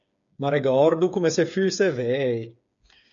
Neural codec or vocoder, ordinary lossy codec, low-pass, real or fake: codec, 16 kHz, 8 kbps, FreqCodec, smaller model; MP3, 64 kbps; 7.2 kHz; fake